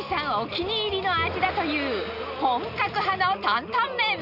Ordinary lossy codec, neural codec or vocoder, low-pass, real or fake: none; none; 5.4 kHz; real